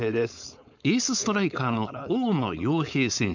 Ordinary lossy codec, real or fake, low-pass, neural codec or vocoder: none; fake; 7.2 kHz; codec, 16 kHz, 4.8 kbps, FACodec